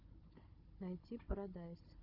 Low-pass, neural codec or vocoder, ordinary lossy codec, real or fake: 5.4 kHz; codec, 16 kHz, 16 kbps, FunCodec, trained on Chinese and English, 50 frames a second; Opus, 32 kbps; fake